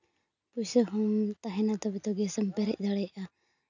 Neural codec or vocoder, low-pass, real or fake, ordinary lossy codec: none; 7.2 kHz; real; none